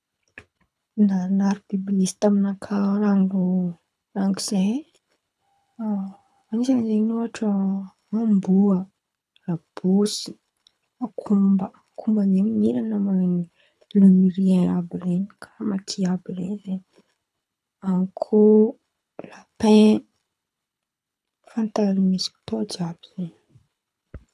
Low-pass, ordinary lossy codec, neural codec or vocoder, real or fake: none; none; codec, 24 kHz, 6 kbps, HILCodec; fake